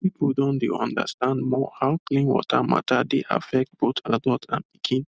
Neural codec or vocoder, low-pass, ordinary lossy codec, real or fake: none; none; none; real